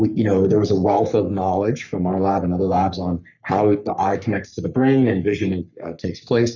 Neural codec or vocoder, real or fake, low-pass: codec, 44.1 kHz, 3.4 kbps, Pupu-Codec; fake; 7.2 kHz